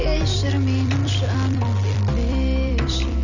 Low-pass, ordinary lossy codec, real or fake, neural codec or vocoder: 7.2 kHz; none; fake; vocoder, 44.1 kHz, 128 mel bands every 256 samples, BigVGAN v2